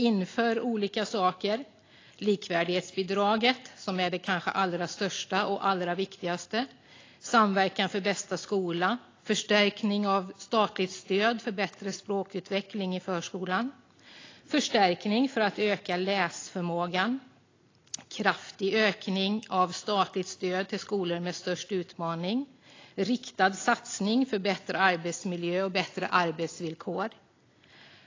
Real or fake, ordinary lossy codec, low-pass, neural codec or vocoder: real; AAC, 32 kbps; 7.2 kHz; none